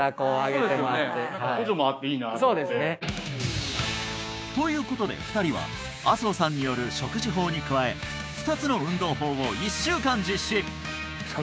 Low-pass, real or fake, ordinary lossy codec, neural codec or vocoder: none; fake; none; codec, 16 kHz, 6 kbps, DAC